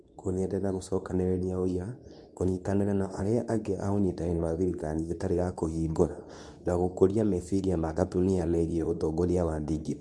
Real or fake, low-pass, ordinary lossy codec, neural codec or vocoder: fake; none; none; codec, 24 kHz, 0.9 kbps, WavTokenizer, medium speech release version 2